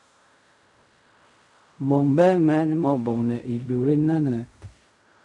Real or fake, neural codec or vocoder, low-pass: fake; codec, 16 kHz in and 24 kHz out, 0.4 kbps, LongCat-Audio-Codec, fine tuned four codebook decoder; 10.8 kHz